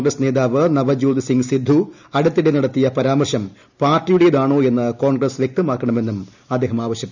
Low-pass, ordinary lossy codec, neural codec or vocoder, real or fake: 7.2 kHz; none; none; real